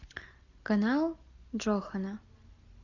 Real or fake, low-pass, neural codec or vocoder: real; 7.2 kHz; none